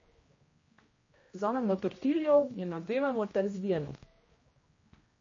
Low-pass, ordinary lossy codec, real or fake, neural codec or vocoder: 7.2 kHz; MP3, 32 kbps; fake; codec, 16 kHz, 1 kbps, X-Codec, HuBERT features, trained on general audio